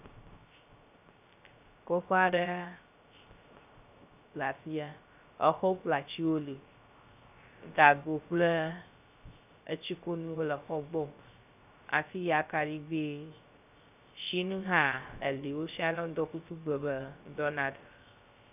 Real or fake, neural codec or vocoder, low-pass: fake; codec, 16 kHz, 0.3 kbps, FocalCodec; 3.6 kHz